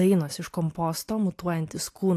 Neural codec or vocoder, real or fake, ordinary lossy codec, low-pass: none; real; AAC, 64 kbps; 14.4 kHz